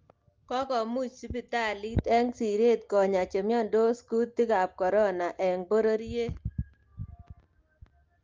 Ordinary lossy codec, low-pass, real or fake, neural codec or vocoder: Opus, 24 kbps; 7.2 kHz; real; none